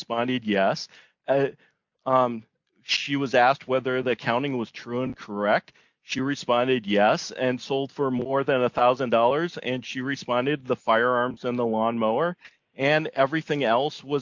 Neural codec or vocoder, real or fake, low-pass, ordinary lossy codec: none; real; 7.2 kHz; MP3, 64 kbps